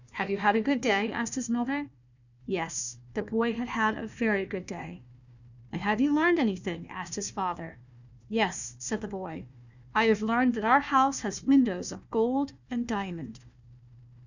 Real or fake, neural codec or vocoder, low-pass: fake; codec, 16 kHz, 1 kbps, FunCodec, trained on Chinese and English, 50 frames a second; 7.2 kHz